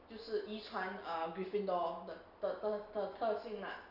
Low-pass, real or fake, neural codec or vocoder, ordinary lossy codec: 5.4 kHz; real; none; none